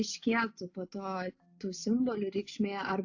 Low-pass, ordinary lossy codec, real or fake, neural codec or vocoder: 7.2 kHz; AAC, 48 kbps; real; none